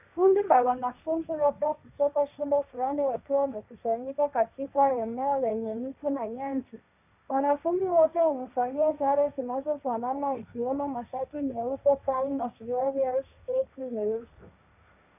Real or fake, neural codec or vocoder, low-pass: fake; codec, 16 kHz, 1.1 kbps, Voila-Tokenizer; 3.6 kHz